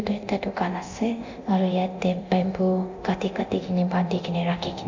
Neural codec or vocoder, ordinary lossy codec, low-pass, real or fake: codec, 24 kHz, 0.9 kbps, DualCodec; MP3, 64 kbps; 7.2 kHz; fake